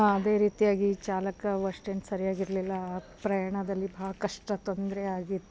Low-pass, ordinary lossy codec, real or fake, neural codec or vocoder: none; none; real; none